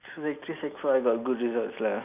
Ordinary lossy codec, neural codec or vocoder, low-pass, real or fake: none; none; 3.6 kHz; real